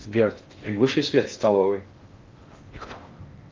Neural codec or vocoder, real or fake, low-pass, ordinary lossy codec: codec, 16 kHz in and 24 kHz out, 0.6 kbps, FocalCodec, streaming, 4096 codes; fake; 7.2 kHz; Opus, 32 kbps